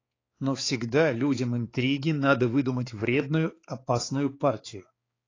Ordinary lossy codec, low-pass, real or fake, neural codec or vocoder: AAC, 32 kbps; 7.2 kHz; fake; codec, 16 kHz, 4 kbps, X-Codec, HuBERT features, trained on balanced general audio